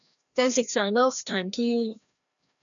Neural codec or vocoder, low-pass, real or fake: codec, 16 kHz, 1 kbps, FreqCodec, larger model; 7.2 kHz; fake